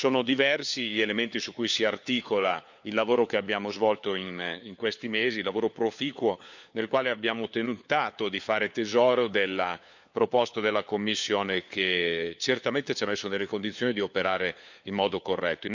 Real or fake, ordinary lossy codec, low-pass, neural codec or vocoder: fake; none; 7.2 kHz; codec, 16 kHz, 4 kbps, FunCodec, trained on LibriTTS, 50 frames a second